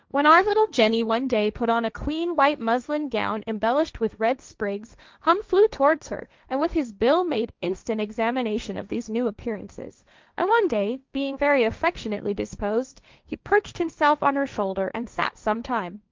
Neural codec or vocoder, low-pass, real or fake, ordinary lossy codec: codec, 16 kHz, 1.1 kbps, Voila-Tokenizer; 7.2 kHz; fake; Opus, 24 kbps